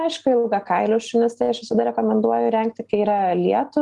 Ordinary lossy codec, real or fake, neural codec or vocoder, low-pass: Opus, 32 kbps; real; none; 10.8 kHz